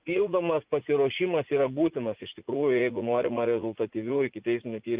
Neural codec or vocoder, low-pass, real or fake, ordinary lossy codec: vocoder, 44.1 kHz, 80 mel bands, Vocos; 3.6 kHz; fake; Opus, 32 kbps